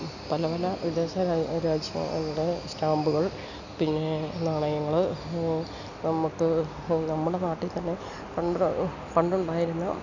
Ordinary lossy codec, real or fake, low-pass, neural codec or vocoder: none; real; 7.2 kHz; none